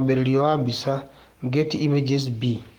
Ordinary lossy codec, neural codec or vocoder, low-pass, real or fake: Opus, 24 kbps; codec, 44.1 kHz, 7.8 kbps, Pupu-Codec; 19.8 kHz; fake